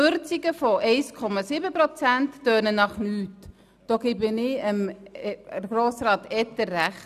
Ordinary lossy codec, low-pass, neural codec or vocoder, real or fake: none; 14.4 kHz; none; real